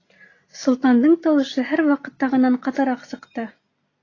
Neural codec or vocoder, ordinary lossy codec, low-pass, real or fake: none; AAC, 32 kbps; 7.2 kHz; real